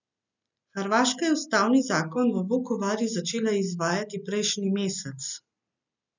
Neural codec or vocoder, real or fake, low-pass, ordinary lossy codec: none; real; 7.2 kHz; none